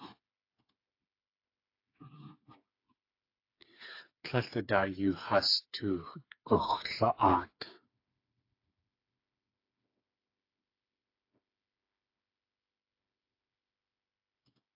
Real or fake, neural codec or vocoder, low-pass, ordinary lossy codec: fake; codec, 16 kHz, 4 kbps, FreqCodec, smaller model; 5.4 kHz; AAC, 32 kbps